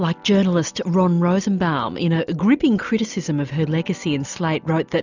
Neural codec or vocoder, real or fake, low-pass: none; real; 7.2 kHz